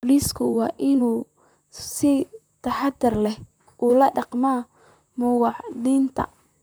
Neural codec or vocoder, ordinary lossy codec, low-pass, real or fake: vocoder, 44.1 kHz, 128 mel bands, Pupu-Vocoder; none; none; fake